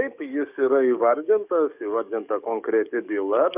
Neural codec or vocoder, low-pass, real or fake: codec, 44.1 kHz, 7.8 kbps, DAC; 3.6 kHz; fake